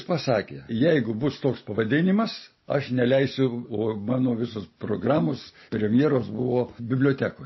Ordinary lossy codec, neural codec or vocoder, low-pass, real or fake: MP3, 24 kbps; none; 7.2 kHz; real